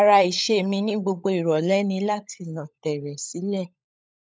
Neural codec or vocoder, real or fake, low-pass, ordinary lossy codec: codec, 16 kHz, 16 kbps, FunCodec, trained on LibriTTS, 50 frames a second; fake; none; none